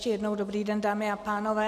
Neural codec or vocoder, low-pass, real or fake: none; 14.4 kHz; real